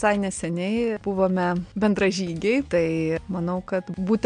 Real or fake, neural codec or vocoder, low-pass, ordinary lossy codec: real; none; 9.9 kHz; MP3, 64 kbps